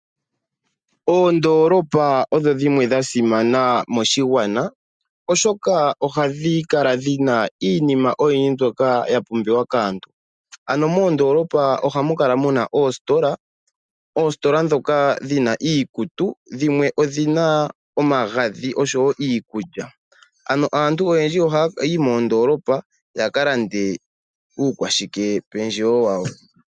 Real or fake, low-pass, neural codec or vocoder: real; 9.9 kHz; none